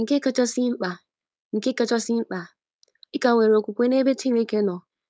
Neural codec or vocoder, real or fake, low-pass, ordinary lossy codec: codec, 16 kHz, 4.8 kbps, FACodec; fake; none; none